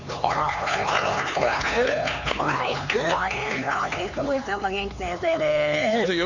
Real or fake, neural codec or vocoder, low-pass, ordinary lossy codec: fake; codec, 16 kHz, 4 kbps, X-Codec, HuBERT features, trained on LibriSpeech; 7.2 kHz; none